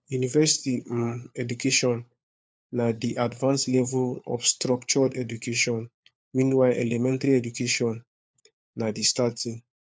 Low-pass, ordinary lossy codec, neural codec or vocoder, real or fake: none; none; codec, 16 kHz, 4 kbps, FunCodec, trained on LibriTTS, 50 frames a second; fake